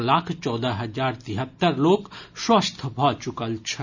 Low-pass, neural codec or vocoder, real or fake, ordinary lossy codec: 7.2 kHz; none; real; none